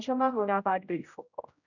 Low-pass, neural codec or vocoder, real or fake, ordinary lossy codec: 7.2 kHz; codec, 16 kHz, 0.5 kbps, X-Codec, HuBERT features, trained on general audio; fake; none